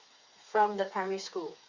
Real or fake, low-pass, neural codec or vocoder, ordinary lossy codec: fake; 7.2 kHz; codec, 16 kHz, 4 kbps, FreqCodec, smaller model; Opus, 64 kbps